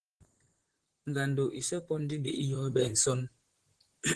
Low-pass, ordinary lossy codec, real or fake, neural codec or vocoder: 10.8 kHz; Opus, 16 kbps; fake; vocoder, 44.1 kHz, 128 mel bands, Pupu-Vocoder